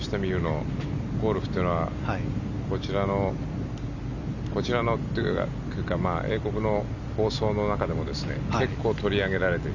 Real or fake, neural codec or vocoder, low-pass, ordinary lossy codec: real; none; 7.2 kHz; none